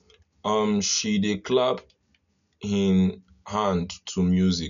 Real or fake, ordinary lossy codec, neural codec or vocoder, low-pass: real; none; none; 7.2 kHz